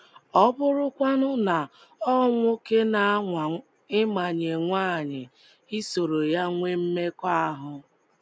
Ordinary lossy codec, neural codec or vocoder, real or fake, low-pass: none; none; real; none